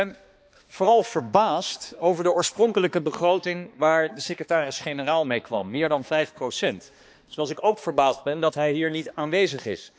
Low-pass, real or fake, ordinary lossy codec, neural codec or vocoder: none; fake; none; codec, 16 kHz, 2 kbps, X-Codec, HuBERT features, trained on balanced general audio